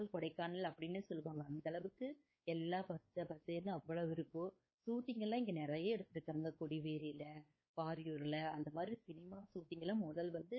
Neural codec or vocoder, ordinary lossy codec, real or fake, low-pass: codec, 16 kHz, 4 kbps, X-Codec, WavLM features, trained on Multilingual LibriSpeech; MP3, 24 kbps; fake; 7.2 kHz